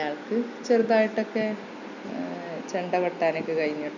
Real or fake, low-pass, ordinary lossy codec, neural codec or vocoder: real; 7.2 kHz; none; none